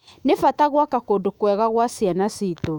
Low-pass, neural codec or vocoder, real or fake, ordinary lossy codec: 19.8 kHz; autoencoder, 48 kHz, 128 numbers a frame, DAC-VAE, trained on Japanese speech; fake; none